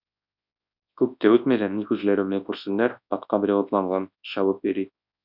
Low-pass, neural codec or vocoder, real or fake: 5.4 kHz; codec, 24 kHz, 0.9 kbps, WavTokenizer, large speech release; fake